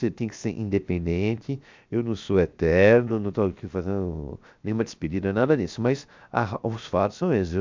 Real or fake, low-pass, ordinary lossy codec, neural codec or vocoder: fake; 7.2 kHz; MP3, 64 kbps; codec, 16 kHz, 0.7 kbps, FocalCodec